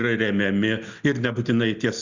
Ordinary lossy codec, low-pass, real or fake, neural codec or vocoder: Opus, 64 kbps; 7.2 kHz; real; none